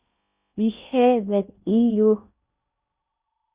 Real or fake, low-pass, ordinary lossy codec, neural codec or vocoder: fake; 3.6 kHz; Opus, 64 kbps; codec, 16 kHz in and 24 kHz out, 0.6 kbps, FocalCodec, streaming, 4096 codes